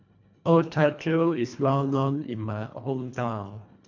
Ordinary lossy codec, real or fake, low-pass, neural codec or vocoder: none; fake; 7.2 kHz; codec, 24 kHz, 1.5 kbps, HILCodec